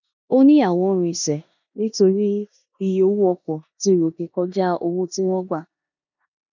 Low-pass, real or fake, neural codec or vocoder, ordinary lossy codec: 7.2 kHz; fake; codec, 16 kHz in and 24 kHz out, 0.9 kbps, LongCat-Audio-Codec, four codebook decoder; none